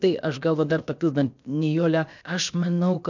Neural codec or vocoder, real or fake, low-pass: codec, 16 kHz, about 1 kbps, DyCAST, with the encoder's durations; fake; 7.2 kHz